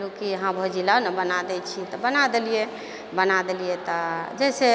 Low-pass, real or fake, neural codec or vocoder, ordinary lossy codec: none; real; none; none